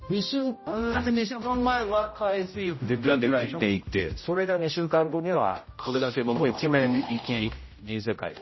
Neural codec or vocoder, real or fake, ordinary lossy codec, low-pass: codec, 16 kHz, 0.5 kbps, X-Codec, HuBERT features, trained on general audio; fake; MP3, 24 kbps; 7.2 kHz